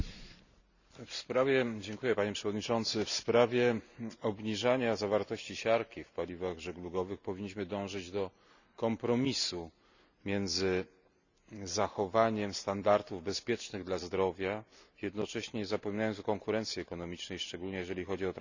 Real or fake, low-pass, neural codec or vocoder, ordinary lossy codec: real; 7.2 kHz; none; none